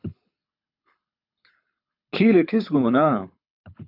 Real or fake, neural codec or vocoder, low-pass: fake; codec, 24 kHz, 6 kbps, HILCodec; 5.4 kHz